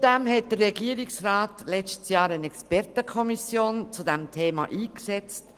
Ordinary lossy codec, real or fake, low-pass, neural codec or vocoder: Opus, 24 kbps; real; 14.4 kHz; none